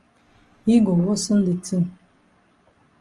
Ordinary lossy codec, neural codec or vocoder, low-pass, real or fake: Opus, 32 kbps; none; 10.8 kHz; real